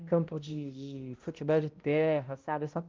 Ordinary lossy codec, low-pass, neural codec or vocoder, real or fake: Opus, 24 kbps; 7.2 kHz; codec, 16 kHz, 0.5 kbps, X-Codec, HuBERT features, trained on balanced general audio; fake